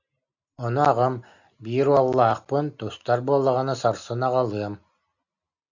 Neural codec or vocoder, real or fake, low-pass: none; real; 7.2 kHz